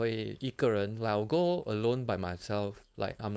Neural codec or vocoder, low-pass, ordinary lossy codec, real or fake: codec, 16 kHz, 4.8 kbps, FACodec; none; none; fake